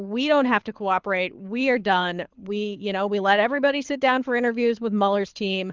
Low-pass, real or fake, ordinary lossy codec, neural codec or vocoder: 7.2 kHz; fake; Opus, 24 kbps; codec, 24 kHz, 6 kbps, HILCodec